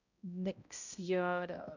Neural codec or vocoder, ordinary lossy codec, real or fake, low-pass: codec, 16 kHz, 0.5 kbps, X-Codec, HuBERT features, trained on balanced general audio; none; fake; 7.2 kHz